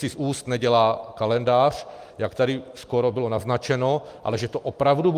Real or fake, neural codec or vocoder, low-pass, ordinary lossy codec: real; none; 14.4 kHz; Opus, 32 kbps